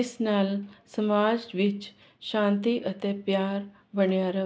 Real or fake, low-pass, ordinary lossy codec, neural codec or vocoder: real; none; none; none